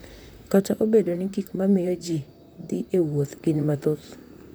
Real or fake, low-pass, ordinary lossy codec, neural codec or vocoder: fake; none; none; vocoder, 44.1 kHz, 128 mel bands, Pupu-Vocoder